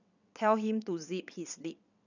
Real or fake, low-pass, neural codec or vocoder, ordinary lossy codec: fake; 7.2 kHz; vocoder, 22.05 kHz, 80 mel bands, WaveNeXt; none